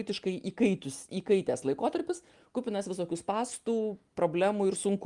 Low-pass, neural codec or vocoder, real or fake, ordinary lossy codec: 10.8 kHz; none; real; Opus, 32 kbps